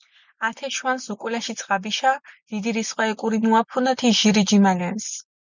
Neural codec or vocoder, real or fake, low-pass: none; real; 7.2 kHz